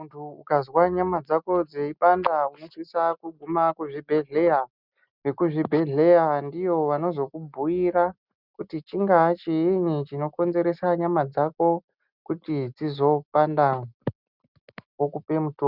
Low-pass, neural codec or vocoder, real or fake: 5.4 kHz; none; real